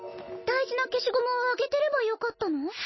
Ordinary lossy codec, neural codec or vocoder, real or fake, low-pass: MP3, 24 kbps; none; real; 7.2 kHz